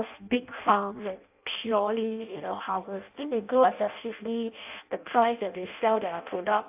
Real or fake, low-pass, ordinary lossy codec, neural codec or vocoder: fake; 3.6 kHz; AAC, 32 kbps; codec, 16 kHz in and 24 kHz out, 0.6 kbps, FireRedTTS-2 codec